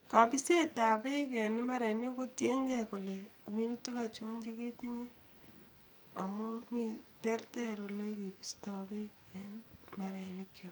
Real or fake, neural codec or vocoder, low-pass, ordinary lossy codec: fake; codec, 44.1 kHz, 2.6 kbps, SNAC; none; none